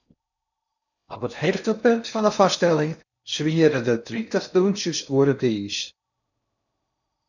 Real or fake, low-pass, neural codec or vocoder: fake; 7.2 kHz; codec, 16 kHz in and 24 kHz out, 0.6 kbps, FocalCodec, streaming, 4096 codes